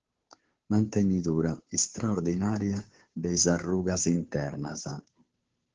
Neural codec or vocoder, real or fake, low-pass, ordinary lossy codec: codec, 16 kHz, 2 kbps, FunCodec, trained on Chinese and English, 25 frames a second; fake; 7.2 kHz; Opus, 16 kbps